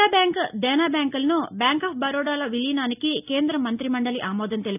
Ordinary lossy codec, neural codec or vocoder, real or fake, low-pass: none; none; real; 3.6 kHz